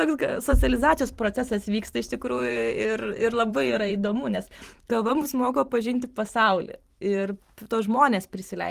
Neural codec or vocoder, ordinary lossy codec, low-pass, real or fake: vocoder, 44.1 kHz, 128 mel bands every 512 samples, BigVGAN v2; Opus, 24 kbps; 14.4 kHz; fake